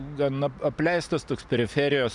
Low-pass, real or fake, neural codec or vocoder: 10.8 kHz; real; none